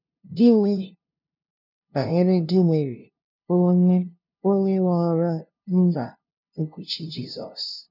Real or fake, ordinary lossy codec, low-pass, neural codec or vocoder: fake; none; 5.4 kHz; codec, 16 kHz, 0.5 kbps, FunCodec, trained on LibriTTS, 25 frames a second